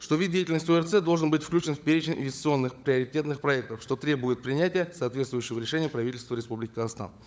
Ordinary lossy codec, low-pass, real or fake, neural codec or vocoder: none; none; fake; codec, 16 kHz, 16 kbps, FunCodec, trained on LibriTTS, 50 frames a second